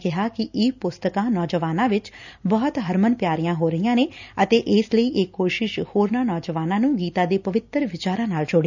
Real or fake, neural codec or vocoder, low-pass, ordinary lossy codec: real; none; 7.2 kHz; none